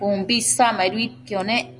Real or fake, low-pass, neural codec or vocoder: real; 10.8 kHz; none